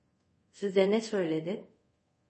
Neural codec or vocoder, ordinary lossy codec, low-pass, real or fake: codec, 24 kHz, 0.5 kbps, DualCodec; MP3, 32 kbps; 10.8 kHz; fake